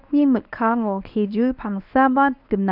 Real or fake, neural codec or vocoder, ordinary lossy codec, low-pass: fake; codec, 24 kHz, 0.9 kbps, WavTokenizer, medium speech release version 1; none; 5.4 kHz